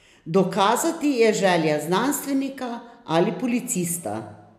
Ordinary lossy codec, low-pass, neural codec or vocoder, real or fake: none; 14.4 kHz; none; real